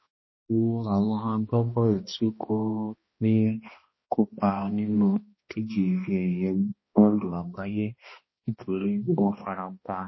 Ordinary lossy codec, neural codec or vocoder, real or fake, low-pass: MP3, 24 kbps; codec, 16 kHz, 1 kbps, X-Codec, HuBERT features, trained on balanced general audio; fake; 7.2 kHz